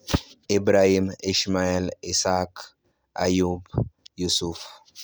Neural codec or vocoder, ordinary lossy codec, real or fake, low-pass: none; none; real; none